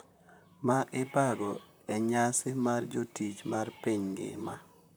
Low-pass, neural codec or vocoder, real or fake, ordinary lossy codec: none; vocoder, 44.1 kHz, 128 mel bands, Pupu-Vocoder; fake; none